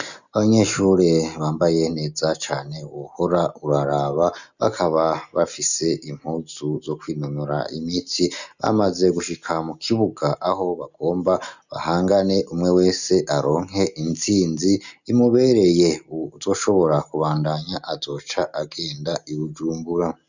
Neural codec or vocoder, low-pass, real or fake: none; 7.2 kHz; real